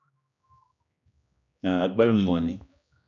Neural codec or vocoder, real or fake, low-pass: codec, 16 kHz, 1 kbps, X-Codec, HuBERT features, trained on general audio; fake; 7.2 kHz